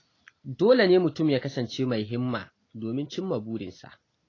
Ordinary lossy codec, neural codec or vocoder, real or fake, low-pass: AAC, 32 kbps; none; real; 7.2 kHz